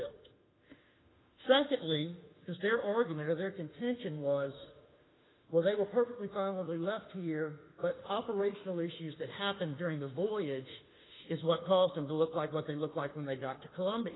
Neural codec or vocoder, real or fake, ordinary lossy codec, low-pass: autoencoder, 48 kHz, 32 numbers a frame, DAC-VAE, trained on Japanese speech; fake; AAC, 16 kbps; 7.2 kHz